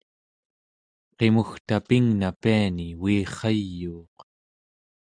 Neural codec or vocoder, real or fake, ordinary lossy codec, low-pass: none; real; Opus, 64 kbps; 9.9 kHz